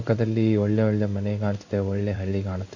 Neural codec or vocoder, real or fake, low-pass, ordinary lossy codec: codec, 16 kHz in and 24 kHz out, 1 kbps, XY-Tokenizer; fake; 7.2 kHz; none